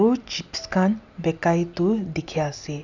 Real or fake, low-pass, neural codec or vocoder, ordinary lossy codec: real; 7.2 kHz; none; none